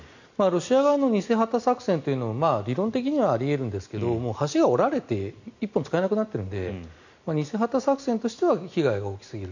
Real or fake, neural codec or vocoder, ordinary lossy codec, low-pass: real; none; none; 7.2 kHz